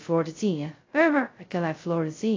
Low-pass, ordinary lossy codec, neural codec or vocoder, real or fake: 7.2 kHz; AAC, 32 kbps; codec, 16 kHz, 0.2 kbps, FocalCodec; fake